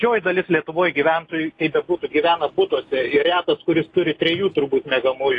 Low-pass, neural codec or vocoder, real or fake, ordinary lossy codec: 10.8 kHz; none; real; AAC, 32 kbps